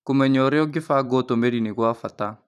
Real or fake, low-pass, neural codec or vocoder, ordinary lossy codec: fake; 14.4 kHz; vocoder, 44.1 kHz, 128 mel bands every 512 samples, BigVGAN v2; none